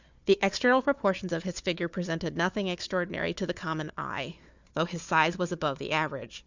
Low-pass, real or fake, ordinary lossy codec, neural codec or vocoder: 7.2 kHz; fake; Opus, 64 kbps; codec, 16 kHz, 4 kbps, FunCodec, trained on Chinese and English, 50 frames a second